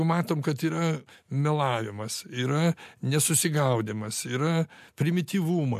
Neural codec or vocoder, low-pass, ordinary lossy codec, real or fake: none; 14.4 kHz; MP3, 64 kbps; real